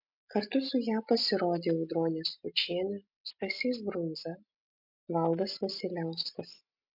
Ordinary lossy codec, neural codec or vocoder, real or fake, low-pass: MP3, 48 kbps; none; real; 5.4 kHz